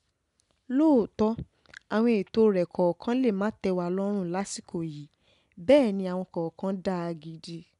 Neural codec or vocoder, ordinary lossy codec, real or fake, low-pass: none; none; real; 10.8 kHz